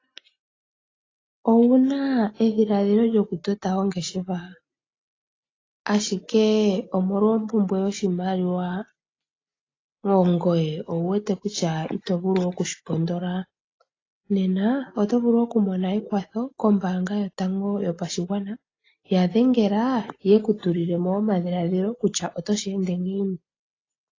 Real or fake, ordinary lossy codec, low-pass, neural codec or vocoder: real; AAC, 32 kbps; 7.2 kHz; none